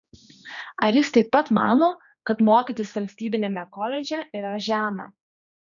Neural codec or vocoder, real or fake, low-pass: codec, 16 kHz, 2 kbps, X-Codec, HuBERT features, trained on general audio; fake; 7.2 kHz